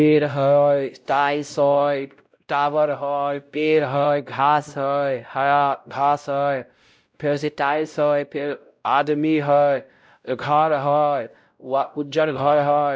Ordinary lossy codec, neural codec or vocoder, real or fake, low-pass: none; codec, 16 kHz, 0.5 kbps, X-Codec, WavLM features, trained on Multilingual LibriSpeech; fake; none